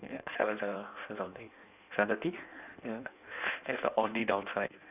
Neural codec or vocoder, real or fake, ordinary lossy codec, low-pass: codec, 16 kHz in and 24 kHz out, 1.1 kbps, FireRedTTS-2 codec; fake; none; 3.6 kHz